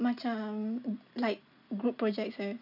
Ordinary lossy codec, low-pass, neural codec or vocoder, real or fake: none; 5.4 kHz; none; real